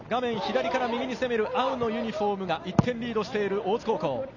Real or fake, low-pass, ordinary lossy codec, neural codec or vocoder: real; 7.2 kHz; none; none